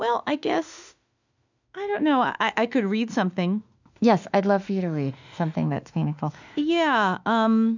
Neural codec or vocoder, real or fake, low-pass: autoencoder, 48 kHz, 32 numbers a frame, DAC-VAE, trained on Japanese speech; fake; 7.2 kHz